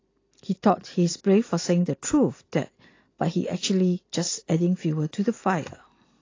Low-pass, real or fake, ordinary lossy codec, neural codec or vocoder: 7.2 kHz; real; AAC, 32 kbps; none